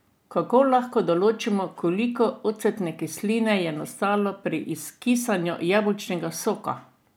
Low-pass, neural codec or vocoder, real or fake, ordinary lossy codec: none; none; real; none